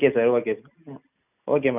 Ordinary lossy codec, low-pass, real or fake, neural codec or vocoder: none; 3.6 kHz; real; none